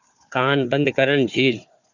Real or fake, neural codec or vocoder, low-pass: fake; codec, 16 kHz, 4 kbps, FunCodec, trained on Chinese and English, 50 frames a second; 7.2 kHz